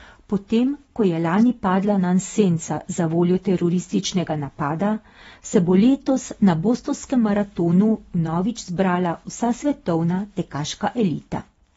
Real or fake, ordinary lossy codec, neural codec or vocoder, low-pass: fake; AAC, 24 kbps; vocoder, 24 kHz, 100 mel bands, Vocos; 10.8 kHz